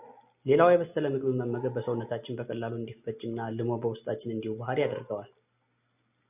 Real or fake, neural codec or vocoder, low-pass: real; none; 3.6 kHz